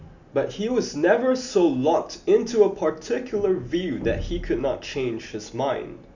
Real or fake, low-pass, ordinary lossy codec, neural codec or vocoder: real; 7.2 kHz; none; none